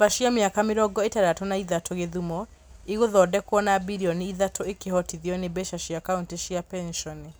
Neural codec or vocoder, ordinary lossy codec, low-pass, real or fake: none; none; none; real